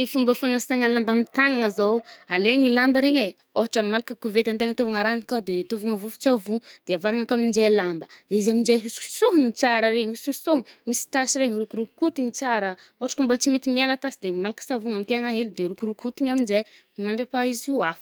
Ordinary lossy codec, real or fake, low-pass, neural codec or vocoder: none; fake; none; codec, 44.1 kHz, 2.6 kbps, SNAC